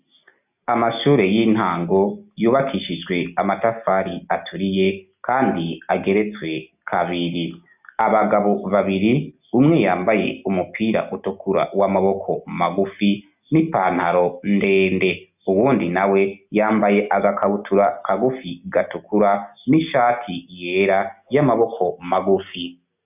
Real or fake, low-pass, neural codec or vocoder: real; 3.6 kHz; none